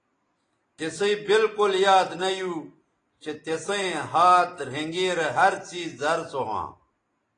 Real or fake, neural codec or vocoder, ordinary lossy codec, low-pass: real; none; AAC, 32 kbps; 9.9 kHz